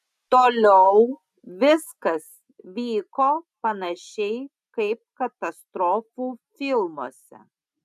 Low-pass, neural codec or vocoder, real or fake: 14.4 kHz; none; real